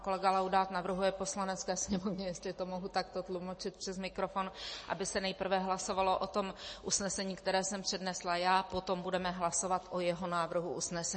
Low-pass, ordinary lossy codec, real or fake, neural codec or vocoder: 10.8 kHz; MP3, 32 kbps; real; none